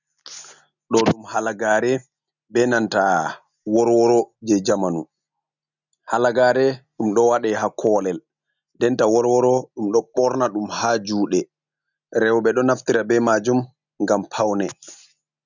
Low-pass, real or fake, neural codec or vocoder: 7.2 kHz; real; none